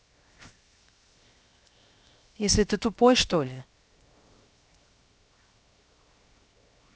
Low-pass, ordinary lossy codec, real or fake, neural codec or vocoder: none; none; fake; codec, 16 kHz, 0.7 kbps, FocalCodec